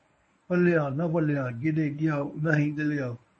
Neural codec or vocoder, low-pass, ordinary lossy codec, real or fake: codec, 24 kHz, 0.9 kbps, WavTokenizer, medium speech release version 1; 10.8 kHz; MP3, 32 kbps; fake